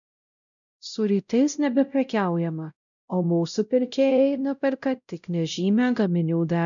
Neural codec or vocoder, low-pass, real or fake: codec, 16 kHz, 0.5 kbps, X-Codec, WavLM features, trained on Multilingual LibriSpeech; 7.2 kHz; fake